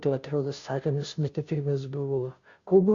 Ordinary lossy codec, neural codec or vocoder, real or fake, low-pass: Opus, 64 kbps; codec, 16 kHz, 0.5 kbps, FunCodec, trained on Chinese and English, 25 frames a second; fake; 7.2 kHz